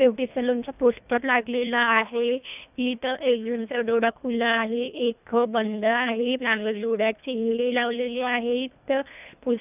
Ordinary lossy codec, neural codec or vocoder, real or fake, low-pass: none; codec, 24 kHz, 1.5 kbps, HILCodec; fake; 3.6 kHz